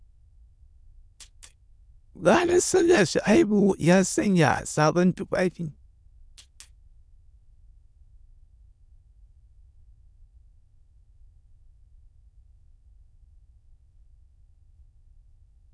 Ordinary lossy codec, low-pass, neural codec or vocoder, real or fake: none; none; autoencoder, 22.05 kHz, a latent of 192 numbers a frame, VITS, trained on many speakers; fake